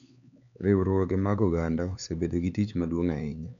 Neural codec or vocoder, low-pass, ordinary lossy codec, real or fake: codec, 16 kHz, 4 kbps, X-Codec, HuBERT features, trained on LibriSpeech; 7.2 kHz; none; fake